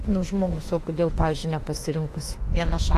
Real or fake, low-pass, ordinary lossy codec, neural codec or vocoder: fake; 14.4 kHz; AAC, 48 kbps; autoencoder, 48 kHz, 32 numbers a frame, DAC-VAE, trained on Japanese speech